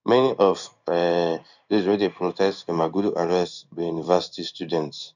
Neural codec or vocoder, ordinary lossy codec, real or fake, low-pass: codec, 16 kHz in and 24 kHz out, 1 kbps, XY-Tokenizer; none; fake; 7.2 kHz